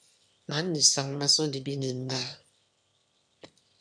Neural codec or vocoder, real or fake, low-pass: autoencoder, 22.05 kHz, a latent of 192 numbers a frame, VITS, trained on one speaker; fake; 9.9 kHz